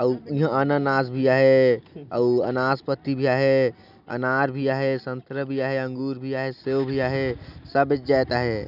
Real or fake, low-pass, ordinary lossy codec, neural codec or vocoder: real; 5.4 kHz; none; none